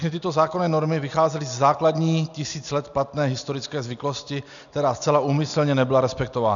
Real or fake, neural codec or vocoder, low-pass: real; none; 7.2 kHz